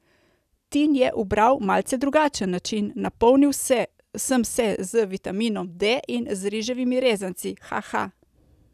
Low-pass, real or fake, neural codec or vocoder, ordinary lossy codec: 14.4 kHz; real; none; none